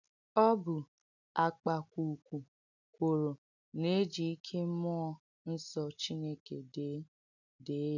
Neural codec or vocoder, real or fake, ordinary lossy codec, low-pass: none; real; none; 7.2 kHz